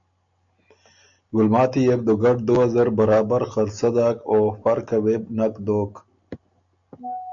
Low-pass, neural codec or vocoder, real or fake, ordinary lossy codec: 7.2 kHz; none; real; MP3, 64 kbps